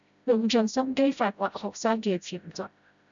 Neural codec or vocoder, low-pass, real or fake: codec, 16 kHz, 0.5 kbps, FreqCodec, smaller model; 7.2 kHz; fake